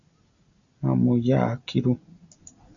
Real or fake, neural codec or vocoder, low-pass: real; none; 7.2 kHz